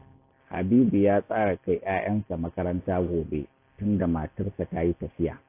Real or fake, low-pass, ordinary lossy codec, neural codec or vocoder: real; 3.6 kHz; AAC, 24 kbps; none